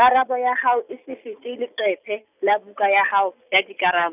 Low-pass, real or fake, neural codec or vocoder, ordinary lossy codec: 3.6 kHz; real; none; none